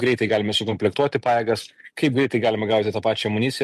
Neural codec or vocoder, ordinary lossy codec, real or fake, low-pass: none; MP3, 64 kbps; real; 14.4 kHz